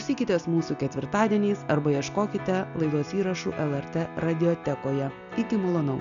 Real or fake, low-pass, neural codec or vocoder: real; 7.2 kHz; none